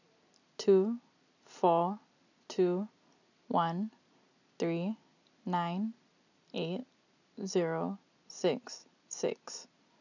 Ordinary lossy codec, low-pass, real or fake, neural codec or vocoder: none; 7.2 kHz; real; none